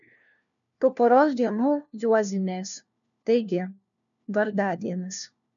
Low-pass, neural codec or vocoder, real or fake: 7.2 kHz; codec, 16 kHz, 1 kbps, FunCodec, trained on LibriTTS, 50 frames a second; fake